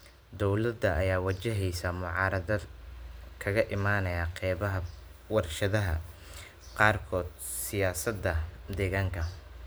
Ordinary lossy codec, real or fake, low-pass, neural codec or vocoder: none; fake; none; vocoder, 44.1 kHz, 128 mel bands every 512 samples, BigVGAN v2